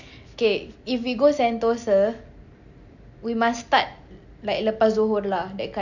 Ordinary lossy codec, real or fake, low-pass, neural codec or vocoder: none; real; 7.2 kHz; none